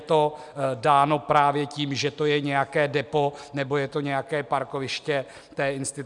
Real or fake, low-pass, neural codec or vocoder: real; 10.8 kHz; none